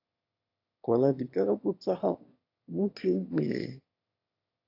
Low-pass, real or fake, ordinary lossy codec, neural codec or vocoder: 5.4 kHz; fake; AAC, 32 kbps; autoencoder, 22.05 kHz, a latent of 192 numbers a frame, VITS, trained on one speaker